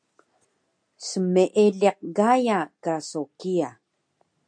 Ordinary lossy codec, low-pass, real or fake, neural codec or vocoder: MP3, 64 kbps; 9.9 kHz; real; none